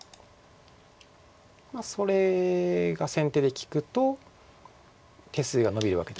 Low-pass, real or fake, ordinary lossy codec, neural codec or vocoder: none; real; none; none